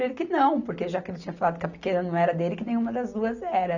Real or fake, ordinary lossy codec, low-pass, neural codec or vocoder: real; none; 7.2 kHz; none